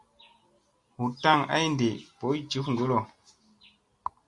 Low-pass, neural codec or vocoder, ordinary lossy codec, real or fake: 10.8 kHz; none; Opus, 64 kbps; real